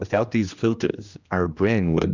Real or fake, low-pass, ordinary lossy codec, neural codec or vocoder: fake; 7.2 kHz; Opus, 64 kbps; codec, 16 kHz, 1 kbps, X-Codec, HuBERT features, trained on general audio